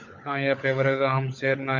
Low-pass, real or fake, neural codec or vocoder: 7.2 kHz; fake; codec, 16 kHz, 16 kbps, FunCodec, trained on LibriTTS, 50 frames a second